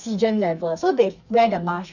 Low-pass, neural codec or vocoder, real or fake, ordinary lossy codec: 7.2 kHz; codec, 32 kHz, 1.9 kbps, SNAC; fake; none